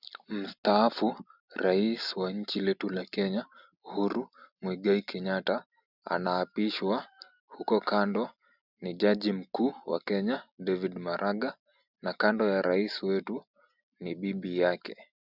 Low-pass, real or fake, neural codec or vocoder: 5.4 kHz; real; none